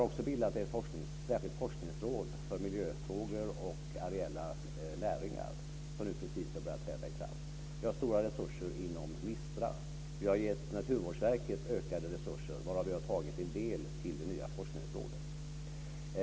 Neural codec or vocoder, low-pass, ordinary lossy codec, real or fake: none; none; none; real